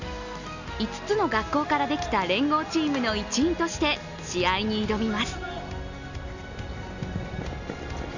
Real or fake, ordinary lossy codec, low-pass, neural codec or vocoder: real; none; 7.2 kHz; none